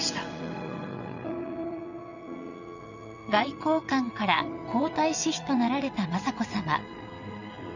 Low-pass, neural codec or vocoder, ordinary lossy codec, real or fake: 7.2 kHz; vocoder, 22.05 kHz, 80 mel bands, WaveNeXt; none; fake